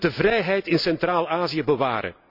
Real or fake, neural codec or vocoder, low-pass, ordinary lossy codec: real; none; 5.4 kHz; none